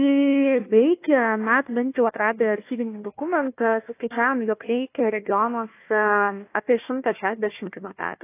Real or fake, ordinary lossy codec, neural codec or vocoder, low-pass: fake; AAC, 24 kbps; codec, 16 kHz, 1 kbps, FunCodec, trained on Chinese and English, 50 frames a second; 3.6 kHz